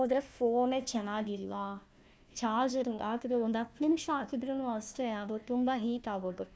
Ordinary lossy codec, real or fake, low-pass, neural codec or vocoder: none; fake; none; codec, 16 kHz, 1 kbps, FunCodec, trained on Chinese and English, 50 frames a second